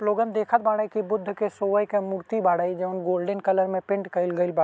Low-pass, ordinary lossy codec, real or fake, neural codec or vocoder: none; none; real; none